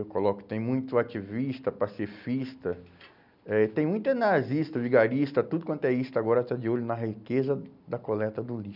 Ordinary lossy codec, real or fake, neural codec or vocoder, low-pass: none; real; none; 5.4 kHz